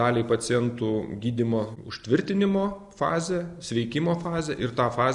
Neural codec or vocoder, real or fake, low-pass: none; real; 10.8 kHz